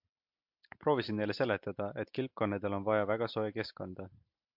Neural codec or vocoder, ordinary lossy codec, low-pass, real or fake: none; MP3, 48 kbps; 5.4 kHz; real